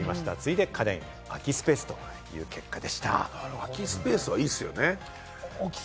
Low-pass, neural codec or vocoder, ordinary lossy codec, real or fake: none; none; none; real